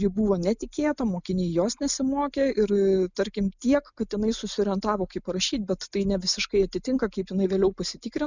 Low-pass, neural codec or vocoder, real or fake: 7.2 kHz; none; real